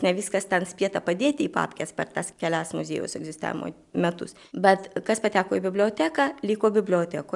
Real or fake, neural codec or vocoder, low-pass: real; none; 10.8 kHz